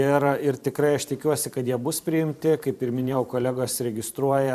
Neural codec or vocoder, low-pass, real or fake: vocoder, 44.1 kHz, 128 mel bands every 512 samples, BigVGAN v2; 14.4 kHz; fake